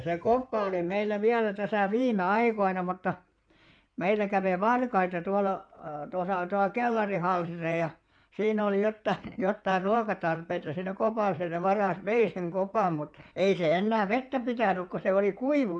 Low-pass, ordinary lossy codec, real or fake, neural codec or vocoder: 9.9 kHz; none; fake; codec, 16 kHz in and 24 kHz out, 2.2 kbps, FireRedTTS-2 codec